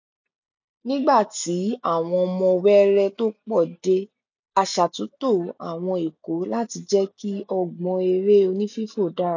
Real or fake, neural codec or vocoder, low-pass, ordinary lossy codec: real; none; 7.2 kHz; none